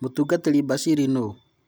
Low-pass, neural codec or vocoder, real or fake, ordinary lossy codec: none; none; real; none